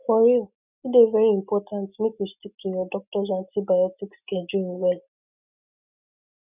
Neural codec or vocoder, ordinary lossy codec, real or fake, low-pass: none; none; real; 3.6 kHz